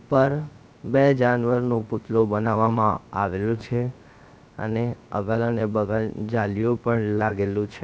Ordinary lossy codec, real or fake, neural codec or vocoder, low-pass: none; fake; codec, 16 kHz, 0.7 kbps, FocalCodec; none